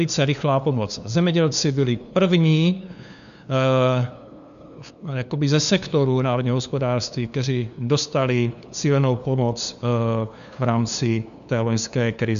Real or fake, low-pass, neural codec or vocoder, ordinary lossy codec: fake; 7.2 kHz; codec, 16 kHz, 2 kbps, FunCodec, trained on LibriTTS, 25 frames a second; MP3, 96 kbps